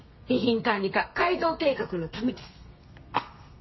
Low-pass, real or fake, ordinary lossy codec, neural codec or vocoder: 7.2 kHz; fake; MP3, 24 kbps; codec, 32 kHz, 1.9 kbps, SNAC